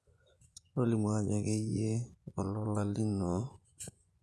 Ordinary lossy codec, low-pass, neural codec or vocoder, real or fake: none; none; vocoder, 24 kHz, 100 mel bands, Vocos; fake